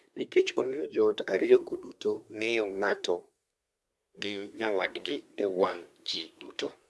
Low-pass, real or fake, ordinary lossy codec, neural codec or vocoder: none; fake; none; codec, 24 kHz, 1 kbps, SNAC